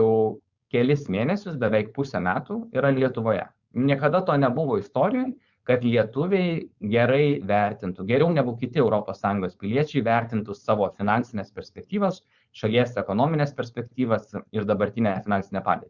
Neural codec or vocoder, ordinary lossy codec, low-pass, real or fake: codec, 16 kHz, 4.8 kbps, FACodec; Opus, 64 kbps; 7.2 kHz; fake